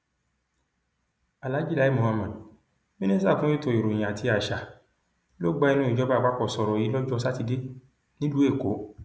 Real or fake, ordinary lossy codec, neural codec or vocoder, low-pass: real; none; none; none